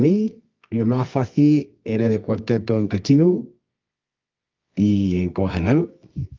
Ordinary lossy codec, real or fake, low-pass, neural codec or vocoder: Opus, 24 kbps; fake; 7.2 kHz; codec, 24 kHz, 0.9 kbps, WavTokenizer, medium music audio release